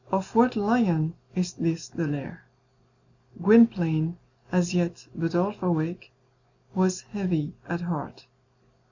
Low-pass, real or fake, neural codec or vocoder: 7.2 kHz; real; none